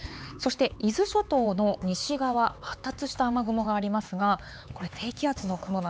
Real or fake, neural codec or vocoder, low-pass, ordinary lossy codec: fake; codec, 16 kHz, 4 kbps, X-Codec, HuBERT features, trained on LibriSpeech; none; none